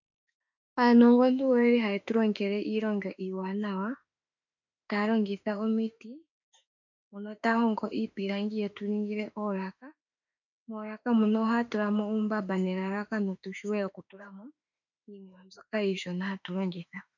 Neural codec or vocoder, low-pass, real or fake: autoencoder, 48 kHz, 32 numbers a frame, DAC-VAE, trained on Japanese speech; 7.2 kHz; fake